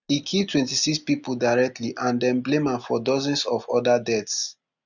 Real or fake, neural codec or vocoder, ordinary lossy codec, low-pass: real; none; none; 7.2 kHz